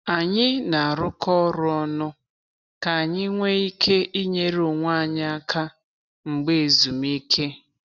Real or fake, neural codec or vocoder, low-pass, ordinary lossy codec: real; none; 7.2 kHz; none